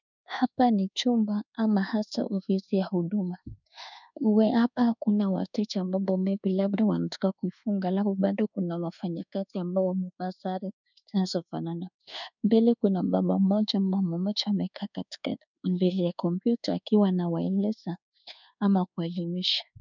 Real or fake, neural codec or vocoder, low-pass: fake; codec, 24 kHz, 1.2 kbps, DualCodec; 7.2 kHz